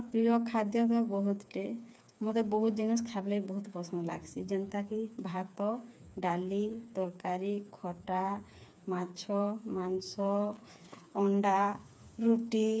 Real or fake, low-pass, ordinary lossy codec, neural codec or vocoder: fake; none; none; codec, 16 kHz, 4 kbps, FreqCodec, smaller model